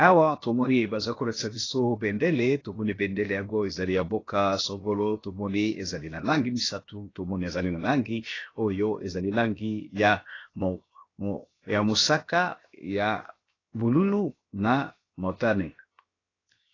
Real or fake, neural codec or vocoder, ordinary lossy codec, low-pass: fake; codec, 16 kHz, 0.7 kbps, FocalCodec; AAC, 32 kbps; 7.2 kHz